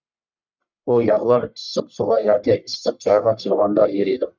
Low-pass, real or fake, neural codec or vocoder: 7.2 kHz; fake; codec, 44.1 kHz, 1.7 kbps, Pupu-Codec